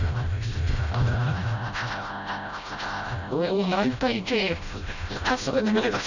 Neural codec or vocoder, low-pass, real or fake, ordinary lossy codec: codec, 16 kHz, 0.5 kbps, FreqCodec, smaller model; 7.2 kHz; fake; none